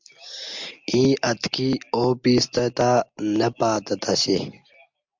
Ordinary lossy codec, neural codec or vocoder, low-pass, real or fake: MP3, 64 kbps; none; 7.2 kHz; real